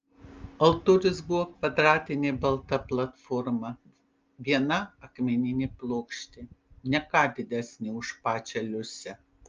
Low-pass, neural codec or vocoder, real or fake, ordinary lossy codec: 7.2 kHz; none; real; Opus, 24 kbps